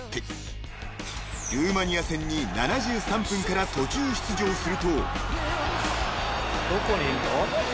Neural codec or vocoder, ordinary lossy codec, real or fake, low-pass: none; none; real; none